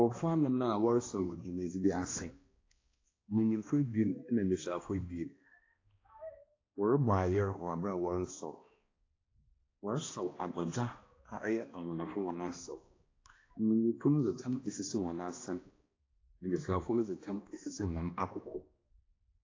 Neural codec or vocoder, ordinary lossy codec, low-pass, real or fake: codec, 16 kHz, 1 kbps, X-Codec, HuBERT features, trained on balanced general audio; AAC, 32 kbps; 7.2 kHz; fake